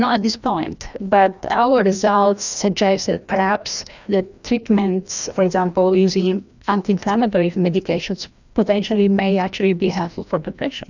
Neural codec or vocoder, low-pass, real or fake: codec, 16 kHz, 1 kbps, FreqCodec, larger model; 7.2 kHz; fake